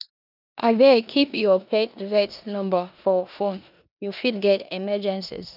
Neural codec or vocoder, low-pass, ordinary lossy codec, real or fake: codec, 16 kHz in and 24 kHz out, 0.9 kbps, LongCat-Audio-Codec, four codebook decoder; 5.4 kHz; none; fake